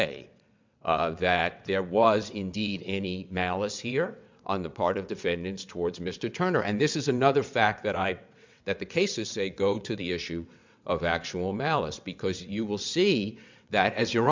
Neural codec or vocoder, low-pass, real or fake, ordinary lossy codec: vocoder, 22.05 kHz, 80 mel bands, WaveNeXt; 7.2 kHz; fake; MP3, 64 kbps